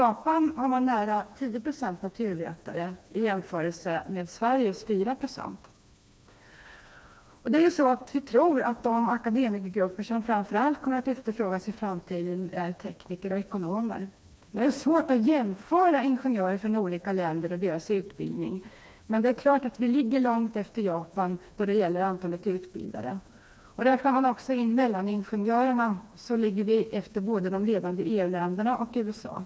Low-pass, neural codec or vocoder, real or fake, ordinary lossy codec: none; codec, 16 kHz, 2 kbps, FreqCodec, smaller model; fake; none